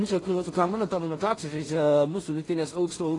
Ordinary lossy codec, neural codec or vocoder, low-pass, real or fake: AAC, 32 kbps; codec, 16 kHz in and 24 kHz out, 0.4 kbps, LongCat-Audio-Codec, two codebook decoder; 10.8 kHz; fake